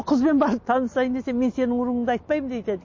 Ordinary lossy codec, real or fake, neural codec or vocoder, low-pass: MP3, 32 kbps; real; none; 7.2 kHz